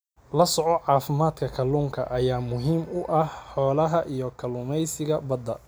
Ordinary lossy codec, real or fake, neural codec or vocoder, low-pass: none; real; none; none